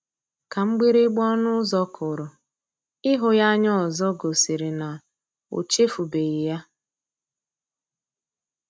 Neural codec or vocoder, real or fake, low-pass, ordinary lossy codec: none; real; none; none